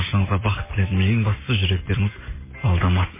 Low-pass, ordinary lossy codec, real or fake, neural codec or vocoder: 3.6 kHz; MP3, 16 kbps; real; none